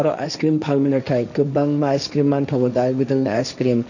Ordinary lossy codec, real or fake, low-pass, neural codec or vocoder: none; fake; 7.2 kHz; codec, 16 kHz, 1.1 kbps, Voila-Tokenizer